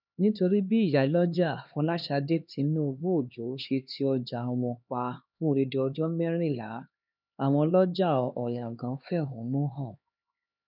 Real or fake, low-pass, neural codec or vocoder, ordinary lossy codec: fake; 5.4 kHz; codec, 16 kHz, 2 kbps, X-Codec, HuBERT features, trained on LibriSpeech; none